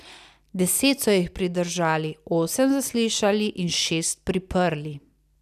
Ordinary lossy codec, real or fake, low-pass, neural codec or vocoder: none; real; 14.4 kHz; none